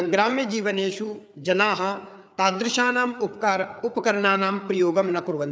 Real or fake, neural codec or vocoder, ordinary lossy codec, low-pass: fake; codec, 16 kHz, 4 kbps, FreqCodec, larger model; none; none